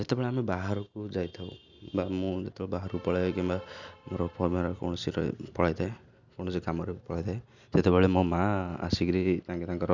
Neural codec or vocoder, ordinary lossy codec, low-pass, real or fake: none; none; 7.2 kHz; real